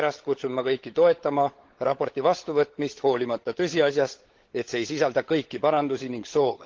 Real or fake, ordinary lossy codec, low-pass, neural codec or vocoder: fake; Opus, 16 kbps; 7.2 kHz; codec, 16 kHz, 16 kbps, FunCodec, trained on LibriTTS, 50 frames a second